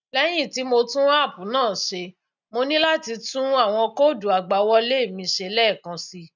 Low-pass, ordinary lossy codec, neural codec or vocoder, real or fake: 7.2 kHz; none; none; real